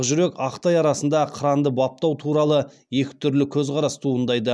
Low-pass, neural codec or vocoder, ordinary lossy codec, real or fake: none; none; none; real